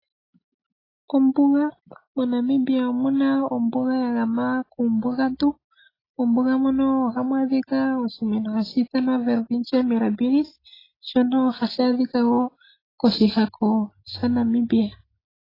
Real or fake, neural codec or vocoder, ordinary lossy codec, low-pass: real; none; AAC, 24 kbps; 5.4 kHz